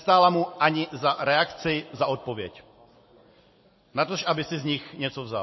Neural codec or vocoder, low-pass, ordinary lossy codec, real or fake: none; 7.2 kHz; MP3, 24 kbps; real